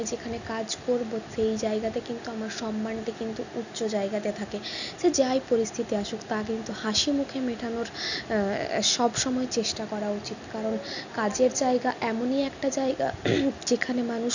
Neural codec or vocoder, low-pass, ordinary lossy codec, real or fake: none; 7.2 kHz; none; real